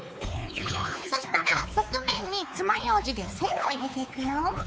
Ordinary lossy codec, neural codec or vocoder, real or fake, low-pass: none; codec, 16 kHz, 4 kbps, X-Codec, WavLM features, trained on Multilingual LibriSpeech; fake; none